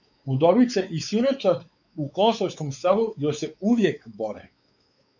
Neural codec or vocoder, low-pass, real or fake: codec, 16 kHz, 4 kbps, X-Codec, WavLM features, trained on Multilingual LibriSpeech; 7.2 kHz; fake